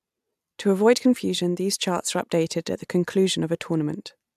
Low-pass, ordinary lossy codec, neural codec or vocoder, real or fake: 14.4 kHz; AAC, 96 kbps; none; real